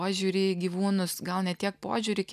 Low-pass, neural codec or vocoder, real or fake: 14.4 kHz; none; real